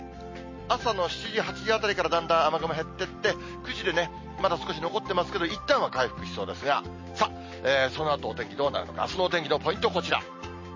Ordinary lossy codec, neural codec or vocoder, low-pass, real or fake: MP3, 32 kbps; none; 7.2 kHz; real